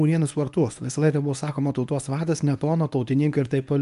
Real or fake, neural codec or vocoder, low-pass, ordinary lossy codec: fake; codec, 24 kHz, 0.9 kbps, WavTokenizer, medium speech release version 1; 10.8 kHz; AAC, 96 kbps